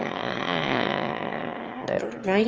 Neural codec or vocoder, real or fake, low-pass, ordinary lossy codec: autoencoder, 22.05 kHz, a latent of 192 numbers a frame, VITS, trained on one speaker; fake; 7.2 kHz; Opus, 24 kbps